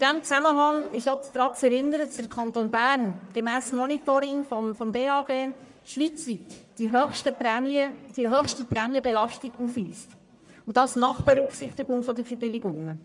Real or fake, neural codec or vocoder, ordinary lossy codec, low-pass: fake; codec, 44.1 kHz, 1.7 kbps, Pupu-Codec; none; 10.8 kHz